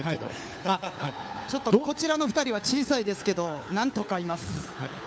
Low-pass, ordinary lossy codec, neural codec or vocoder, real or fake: none; none; codec, 16 kHz, 4 kbps, FunCodec, trained on LibriTTS, 50 frames a second; fake